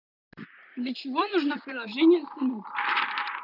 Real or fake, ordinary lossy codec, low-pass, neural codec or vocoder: fake; none; 5.4 kHz; vocoder, 44.1 kHz, 128 mel bands, Pupu-Vocoder